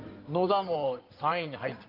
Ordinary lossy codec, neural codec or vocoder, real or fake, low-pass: Opus, 32 kbps; codec, 16 kHz in and 24 kHz out, 2.2 kbps, FireRedTTS-2 codec; fake; 5.4 kHz